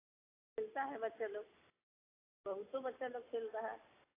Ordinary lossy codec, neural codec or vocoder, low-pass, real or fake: AAC, 16 kbps; none; 3.6 kHz; real